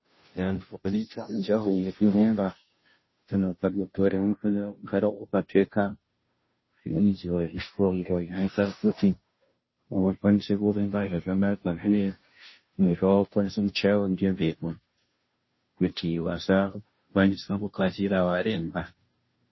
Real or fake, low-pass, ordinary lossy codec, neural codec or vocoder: fake; 7.2 kHz; MP3, 24 kbps; codec, 16 kHz, 0.5 kbps, FunCodec, trained on Chinese and English, 25 frames a second